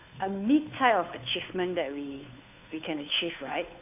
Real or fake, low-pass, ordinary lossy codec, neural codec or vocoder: fake; 3.6 kHz; none; codec, 16 kHz, 2 kbps, FunCodec, trained on Chinese and English, 25 frames a second